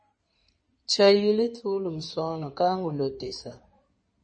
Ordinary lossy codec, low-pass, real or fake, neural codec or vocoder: MP3, 32 kbps; 9.9 kHz; fake; codec, 16 kHz in and 24 kHz out, 2.2 kbps, FireRedTTS-2 codec